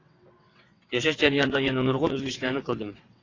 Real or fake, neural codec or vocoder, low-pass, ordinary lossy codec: fake; vocoder, 22.05 kHz, 80 mel bands, WaveNeXt; 7.2 kHz; AAC, 32 kbps